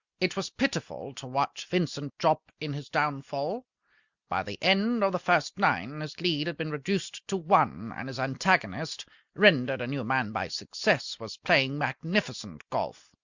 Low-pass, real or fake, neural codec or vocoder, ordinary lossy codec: 7.2 kHz; real; none; Opus, 64 kbps